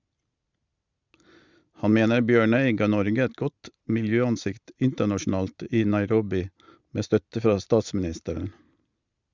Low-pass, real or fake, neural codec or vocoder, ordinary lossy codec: 7.2 kHz; real; none; Opus, 64 kbps